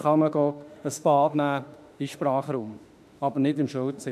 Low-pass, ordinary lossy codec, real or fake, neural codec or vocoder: 14.4 kHz; none; fake; autoencoder, 48 kHz, 32 numbers a frame, DAC-VAE, trained on Japanese speech